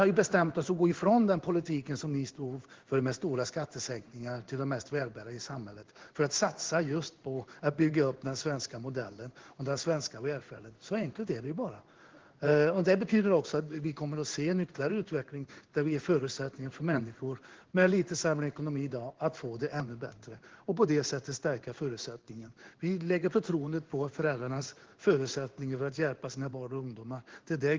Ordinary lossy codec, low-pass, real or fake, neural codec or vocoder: Opus, 16 kbps; 7.2 kHz; fake; codec, 16 kHz in and 24 kHz out, 1 kbps, XY-Tokenizer